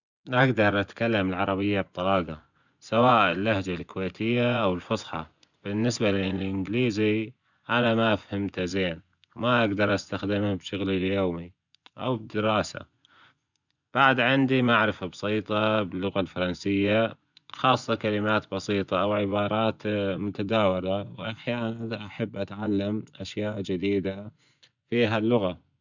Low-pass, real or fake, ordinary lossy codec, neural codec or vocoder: 7.2 kHz; fake; none; vocoder, 24 kHz, 100 mel bands, Vocos